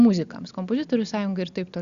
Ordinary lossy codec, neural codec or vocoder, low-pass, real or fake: Opus, 64 kbps; none; 7.2 kHz; real